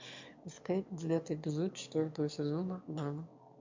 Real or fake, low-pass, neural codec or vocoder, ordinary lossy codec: fake; 7.2 kHz; autoencoder, 22.05 kHz, a latent of 192 numbers a frame, VITS, trained on one speaker; MP3, 48 kbps